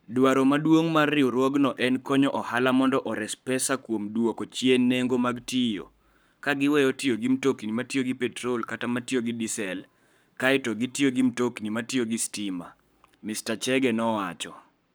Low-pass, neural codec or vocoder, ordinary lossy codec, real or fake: none; codec, 44.1 kHz, 7.8 kbps, Pupu-Codec; none; fake